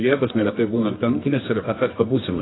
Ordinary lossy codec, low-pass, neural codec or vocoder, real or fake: AAC, 16 kbps; 7.2 kHz; codec, 24 kHz, 0.9 kbps, WavTokenizer, medium music audio release; fake